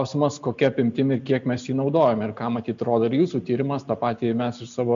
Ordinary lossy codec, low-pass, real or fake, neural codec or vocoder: MP3, 64 kbps; 7.2 kHz; real; none